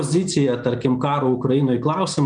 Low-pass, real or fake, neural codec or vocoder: 9.9 kHz; real; none